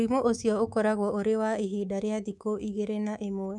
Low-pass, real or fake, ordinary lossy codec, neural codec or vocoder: 10.8 kHz; fake; none; codec, 24 kHz, 3.1 kbps, DualCodec